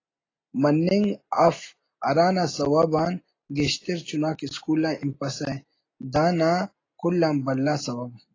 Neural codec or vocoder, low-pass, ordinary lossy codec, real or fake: none; 7.2 kHz; AAC, 32 kbps; real